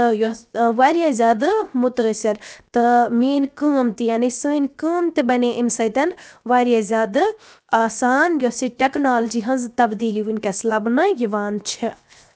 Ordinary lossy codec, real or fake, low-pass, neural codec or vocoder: none; fake; none; codec, 16 kHz, 0.7 kbps, FocalCodec